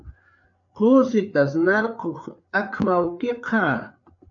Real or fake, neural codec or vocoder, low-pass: fake; codec, 16 kHz, 4 kbps, FreqCodec, larger model; 7.2 kHz